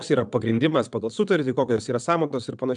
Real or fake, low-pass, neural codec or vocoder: fake; 9.9 kHz; vocoder, 22.05 kHz, 80 mel bands, WaveNeXt